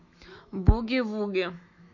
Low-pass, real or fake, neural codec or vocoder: 7.2 kHz; fake; autoencoder, 48 kHz, 128 numbers a frame, DAC-VAE, trained on Japanese speech